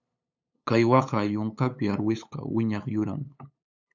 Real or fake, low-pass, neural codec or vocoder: fake; 7.2 kHz; codec, 16 kHz, 8 kbps, FunCodec, trained on LibriTTS, 25 frames a second